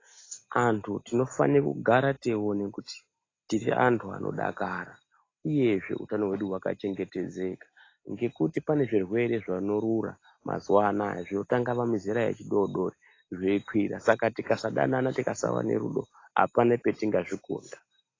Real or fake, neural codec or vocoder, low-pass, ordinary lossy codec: real; none; 7.2 kHz; AAC, 32 kbps